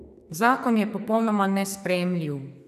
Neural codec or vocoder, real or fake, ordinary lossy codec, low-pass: codec, 44.1 kHz, 2.6 kbps, SNAC; fake; none; 14.4 kHz